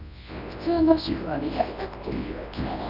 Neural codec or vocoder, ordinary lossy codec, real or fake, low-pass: codec, 24 kHz, 0.9 kbps, WavTokenizer, large speech release; none; fake; 5.4 kHz